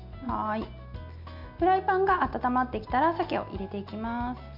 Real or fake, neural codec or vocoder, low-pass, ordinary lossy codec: real; none; 5.4 kHz; none